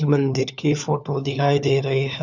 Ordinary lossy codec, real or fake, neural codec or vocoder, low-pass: none; fake; codec, 16 kHz, 4 kbps, FunCodec, trained on LibriTTS, 50 frames a second; 7.2 kHz